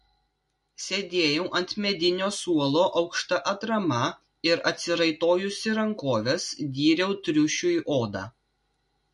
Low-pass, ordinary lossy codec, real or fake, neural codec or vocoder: 14.4 kHz; MP3, 48 kbps; real; none